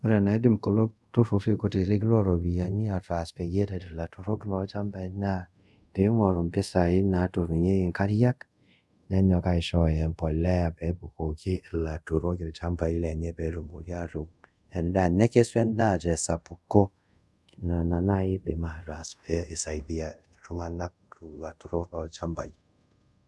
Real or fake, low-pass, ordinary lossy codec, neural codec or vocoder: fake; none; none; codec, 24 kHz, 0.5 kbps, DualCodec